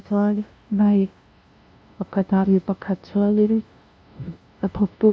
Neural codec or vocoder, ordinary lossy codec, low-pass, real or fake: codec, 16 kHz, 0.5 kbps, FunCodec, trained on LibriTTS, 25 frames a second; none; none; fake